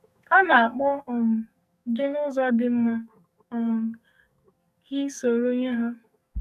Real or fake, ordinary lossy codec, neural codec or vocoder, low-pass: fake; none; codec, 44.1 kHz, 2.6 kbps, SNAC; 14.4 kHz